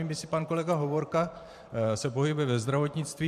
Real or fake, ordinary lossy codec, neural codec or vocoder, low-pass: fake; MP3, 64 kbps; vocoder, 44.1 kHz, 128 mel bands every 512 samples, BigVGAN v2; 14.4 kHz